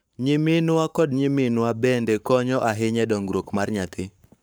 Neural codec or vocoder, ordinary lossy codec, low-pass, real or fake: codec, 44.1 kHz, 7.8 kbps, Pupu-Codec; none; none; fake